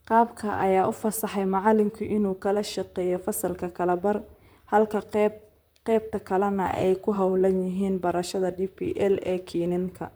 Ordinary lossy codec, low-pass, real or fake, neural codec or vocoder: none; none; fake; vocoder, 44.1 kHz, 128 mel bands, Pupu-Vocoder